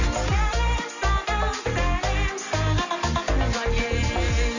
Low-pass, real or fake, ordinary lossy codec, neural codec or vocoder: 7.2 kHz; real; none; none